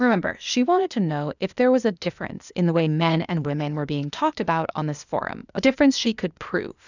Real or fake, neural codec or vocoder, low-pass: fake; codec, 16 kHz, 0.8 kbps, ZipCodec; 7.2 kHz